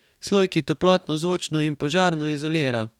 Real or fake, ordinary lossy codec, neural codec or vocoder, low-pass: fake; none; codec, 44.1 kHz, 2.6 kbps, DAC; 19.8 kHz